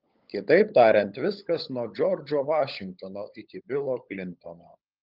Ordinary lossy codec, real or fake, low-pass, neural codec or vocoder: Opus, 24 kbps; fake; 5.4 kHz; codec, 16 kHz, 16 kbps, FunCodec, trained on LibriTTS, 50 frames a second